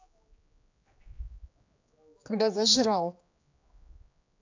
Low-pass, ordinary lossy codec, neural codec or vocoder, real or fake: 7.2 kHz; AAC, 48 kbps; codec, 16 kHz, 2 kbps, X-Codec, HuBERT features, trained on general audio; fake